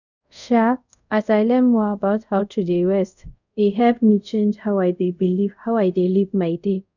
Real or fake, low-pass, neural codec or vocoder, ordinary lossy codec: fake; 7.2 kHz; codec, 24 kHz, 0.5 kbps, DualCodec; none